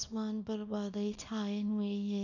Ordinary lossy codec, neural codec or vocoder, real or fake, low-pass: none; codec, 24 kHz, 0.9 kbps, WavTokenizer, small release; fake; 7.2 kHz